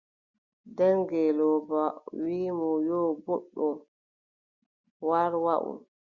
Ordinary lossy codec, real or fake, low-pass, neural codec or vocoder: Opus, 64 kbps; real; 7.2 kHz; none